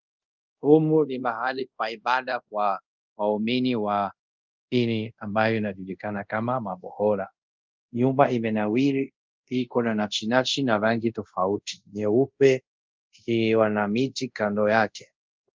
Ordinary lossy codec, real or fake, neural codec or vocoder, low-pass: Opus, 24 kbps; fake; codec, 24 kHz, 0.5 kbps, DualCodec; 7.2 kHz